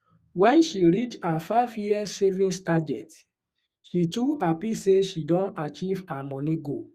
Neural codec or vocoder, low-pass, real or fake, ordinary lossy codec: codec, 32 kHz, 1.9 kbps, SNAC; 14.4 kHz; fake; Opus, 64 kbps